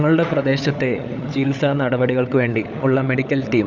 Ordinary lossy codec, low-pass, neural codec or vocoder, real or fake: none; none; codec, 16 kHz, 16 kbps, FunCodec, trained on LibriTTS, 50 frames a second; fake